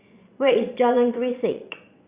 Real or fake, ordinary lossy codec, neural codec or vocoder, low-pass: fake; Opus, 64 kbps; vocoder, 22.05 kHz, 80 mel bands, Vocos; 3.6 kHz